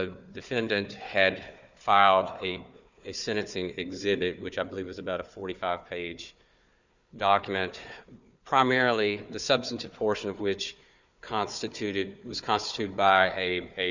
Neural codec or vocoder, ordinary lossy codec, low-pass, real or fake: codec, 16 kHz, 4 kbps, FunCodec, trained on Chinese and English, 50 frames a second; Opus, 64 kbps; 7.2 kHz; fake